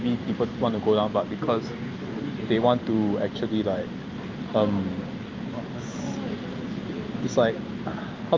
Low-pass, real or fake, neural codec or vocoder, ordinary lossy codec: 7.2 kHz; real; none; Opus, 24 kbps